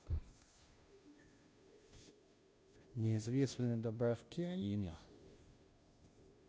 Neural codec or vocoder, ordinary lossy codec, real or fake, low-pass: codec, 16 kHz, 0.5 kbps, FunCodec, trained on Chinese and English, 25 frames a second; none; fake; none